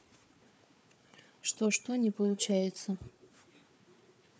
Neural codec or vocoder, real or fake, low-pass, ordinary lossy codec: codec, 16 kHz, 4 kbps, FunCodec, trained on Chinese and English, 50 frames a second; fake; none; none